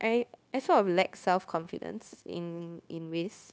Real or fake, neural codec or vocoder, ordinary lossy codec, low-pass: fake; codec, 16 kHz, 0.9 kbps, LongCat-Audio-Codec; none; none